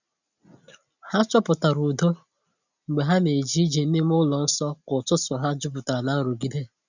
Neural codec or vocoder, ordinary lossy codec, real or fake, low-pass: none; none; real; 7.2 kHz